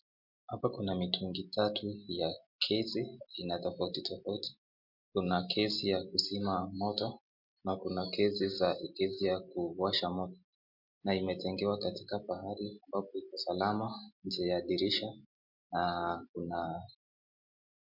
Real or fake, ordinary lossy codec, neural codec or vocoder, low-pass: real; MP3, 48 kbps; none; 5.4 kHz